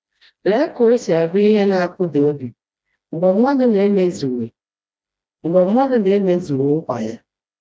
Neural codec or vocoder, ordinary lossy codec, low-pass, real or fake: codec, 16 kHz, 1 kbps, FreqCodec, smaller model; none; none; fake